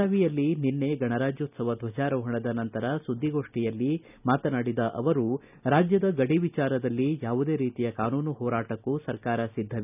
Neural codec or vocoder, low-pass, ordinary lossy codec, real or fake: none; 3.6 kHz; none; real